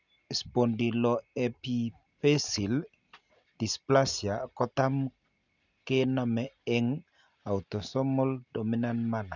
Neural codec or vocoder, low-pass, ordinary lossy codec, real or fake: none; 7.2 kHz; none; real